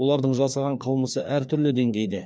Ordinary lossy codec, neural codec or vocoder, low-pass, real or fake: none; codec, 16 kHz, 2 kbps, FreqCodec, larger model; none; fake